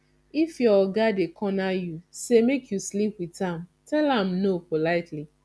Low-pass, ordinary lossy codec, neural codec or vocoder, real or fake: none; none; none; real